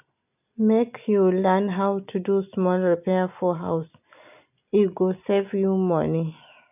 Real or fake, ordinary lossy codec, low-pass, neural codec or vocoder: real; none; 3.6 kHz; none